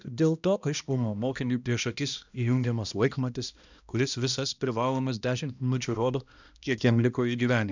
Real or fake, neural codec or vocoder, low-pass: fake; codec, 16 kHz, 1 kbps, X-Codec, HuBERT features, trained on balanced general audio; 7.2 kHz